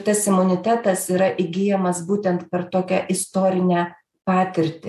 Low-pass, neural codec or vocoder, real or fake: 14.4 kHz; none; real